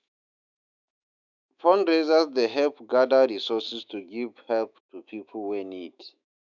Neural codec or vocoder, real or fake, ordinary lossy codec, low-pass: autoencoder, 48 kHz, 128 numbers a frame, DAC-VAE, trained on Japanese speech; fake; none; 7.2 kHz